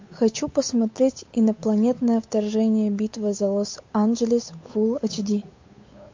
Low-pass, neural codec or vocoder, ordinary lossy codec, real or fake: 7.2 kHz; codec, 16 kHz, 8 kbps, FunCodec, trained on Chinese and English, 25 frames a second; MP3, 48 kbps; fake